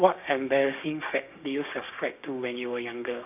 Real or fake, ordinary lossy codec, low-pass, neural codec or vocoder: fake; none; 3.6 kHz; codec, 16 kHz, 6 kbps, DAC